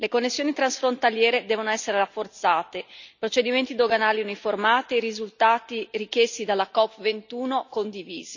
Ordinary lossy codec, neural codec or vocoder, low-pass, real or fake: none; none; 7.2 kHz; real